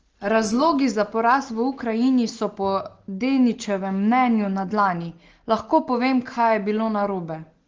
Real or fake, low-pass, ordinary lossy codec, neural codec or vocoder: real; 7.2 kHz; Opus, 16 kbps; none